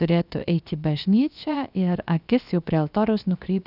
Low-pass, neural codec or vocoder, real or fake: 5.4 kHz; codec, 16 kHz, about 1 kbps, DyCAST, with the encoder's durations; fake